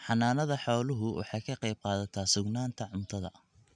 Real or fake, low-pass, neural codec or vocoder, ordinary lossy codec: real; 9.9 kHz; none; none